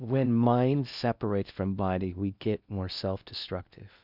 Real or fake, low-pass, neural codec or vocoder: fake; 5.4 kHz; codec, 16 kHz in and 24 kHz out, 0.6 kbps, FocalCodec, streaming, 4096 codes